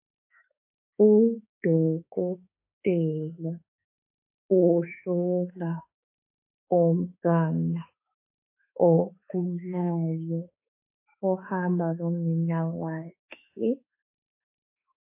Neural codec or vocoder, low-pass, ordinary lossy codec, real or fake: autoencoder, 48 kHz, 32 numbers a frame, DAC-VAE, trained on Japanese speech; 3.6 kHz; MP3, 24 kbps; fake